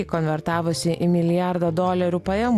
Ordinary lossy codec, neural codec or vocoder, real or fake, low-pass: Opus, 64 kbps; none; real; 14.4 kHz